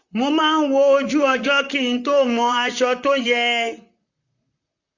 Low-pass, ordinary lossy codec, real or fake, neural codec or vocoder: 7.2 kHz; none; fake; vocoder, 44.1 kHz, 128 mel bands, Pupu-Vocoder